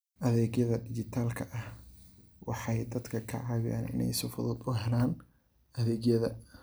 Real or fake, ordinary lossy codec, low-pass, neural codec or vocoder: real; none; none; none